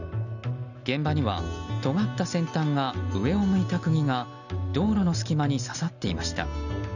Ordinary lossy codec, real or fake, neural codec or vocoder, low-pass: none; real; none; 7.2 kHz